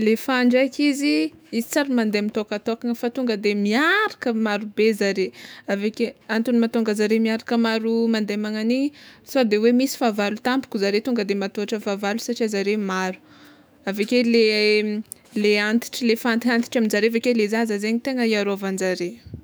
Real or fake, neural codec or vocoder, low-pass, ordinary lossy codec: fake; autoencoder, 48 kHz, 128 numbers a frame, DAC-VAE, trained on Japanese speech; none; none